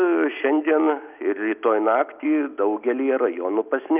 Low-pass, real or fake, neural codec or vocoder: 3.6 kHz; real; none